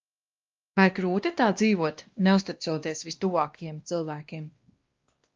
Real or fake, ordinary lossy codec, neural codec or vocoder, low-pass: fake; Opus, 32 kbps; codec, 16 kHz, 1 kbps, X-Codec, WavLM features, trained on Multilingual LibriSpeech; 7.2 kHz